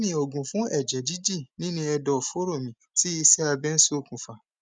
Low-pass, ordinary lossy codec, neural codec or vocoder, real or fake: 9.9 kHz; none; none; real